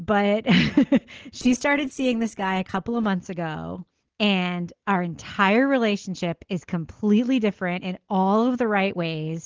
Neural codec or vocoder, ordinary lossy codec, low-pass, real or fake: none; Opus, 16 kbps; 7.2 kHz; real